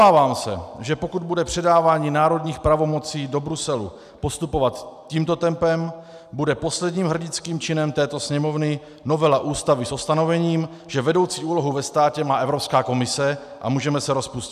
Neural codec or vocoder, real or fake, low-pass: none; real; 14.4 kHz